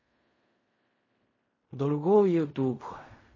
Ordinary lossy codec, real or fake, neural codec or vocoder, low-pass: MP3, 32 kbps; fake; codec, 16 kHz in and 24 kHz out, 0.4 kbps, LongCat-Audio-Codec, fine tuned four codebook decoder; 7.2 kHz